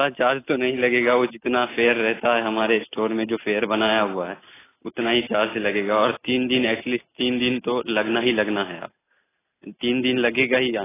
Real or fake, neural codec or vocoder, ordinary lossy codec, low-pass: real; none; AAC, 16 kbps; 3.6 kHz